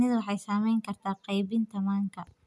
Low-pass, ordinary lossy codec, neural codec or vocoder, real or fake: none; none; none; real